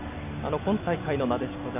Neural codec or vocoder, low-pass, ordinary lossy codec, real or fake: none; 3.6 kHz; none; real